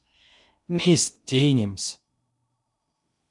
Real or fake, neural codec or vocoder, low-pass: fake; codec, 16 kHz in and 24 kHz out, 0.6 kbps, FocalCodec, streaming, 2048 codes; 10.8 kHz